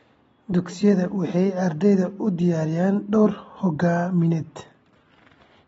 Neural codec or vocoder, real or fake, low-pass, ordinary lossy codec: none; real; 19.8 kHz; AAC, 24 kbps